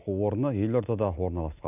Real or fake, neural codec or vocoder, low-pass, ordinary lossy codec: real; none; 3.6 kHz; none